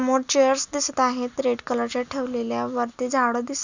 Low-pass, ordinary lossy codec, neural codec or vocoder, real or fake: 7.2 kHz; none; none; real